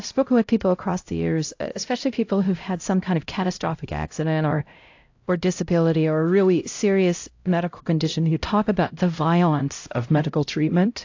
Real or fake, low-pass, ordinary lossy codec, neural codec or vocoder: fake; 7.2 kHz; AAC, 48 kbps; codec, 16 kHz, 0.5 kbps, X-Codec, HuBERT features, trained on LibriSpeech